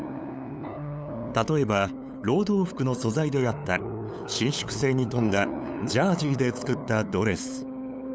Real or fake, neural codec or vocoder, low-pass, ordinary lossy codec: fake; codec, 16 kHz, 8 kbps, FunCodec, trained on LibriTTS, 25 frames a second; none; none